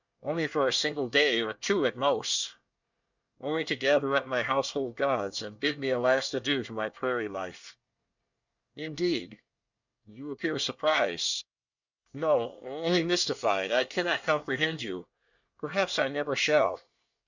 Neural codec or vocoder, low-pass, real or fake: codec, 24 kHz, 1 kbps, SNAC; 7.2 kHz; fake